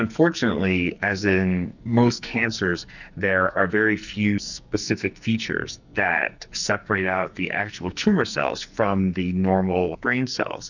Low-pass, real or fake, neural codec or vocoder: 7.2 kHz; fake; codec, 44.1 kHz, 2.6 kbps, SNAC